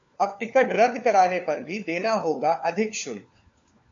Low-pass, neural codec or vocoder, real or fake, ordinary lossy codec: 7.2 kHz; codec, 16 kHz, 4 kbps, FunCodec, trained on LibriTTS, 50 frames a second; fake; AAC, 64 kbps